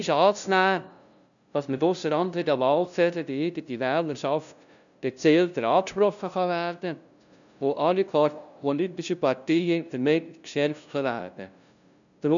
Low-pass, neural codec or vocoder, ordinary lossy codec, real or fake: 7.2 kHz; codec, 16 kHz, 0.5 kbps, FunCodec, trained on LibriTTS, 25 frames a second; none; fake